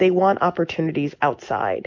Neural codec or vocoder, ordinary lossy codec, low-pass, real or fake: none; MP3, 64 kbps; 7.2 kHz; real